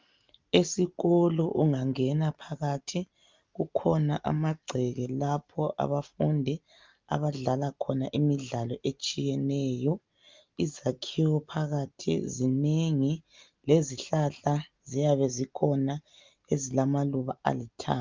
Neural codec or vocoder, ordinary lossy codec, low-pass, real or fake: none; Opus, 32 kbps; 7.2 kHz; real